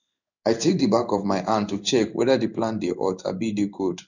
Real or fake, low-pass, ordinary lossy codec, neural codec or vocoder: fake; 7.2 kHz; none; codec, 16 kHz in and 24 kHz out, 1 kbps, XY-Tokenizer